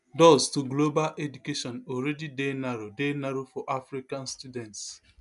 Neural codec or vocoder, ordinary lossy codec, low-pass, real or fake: none; none; 10.8 kHz; real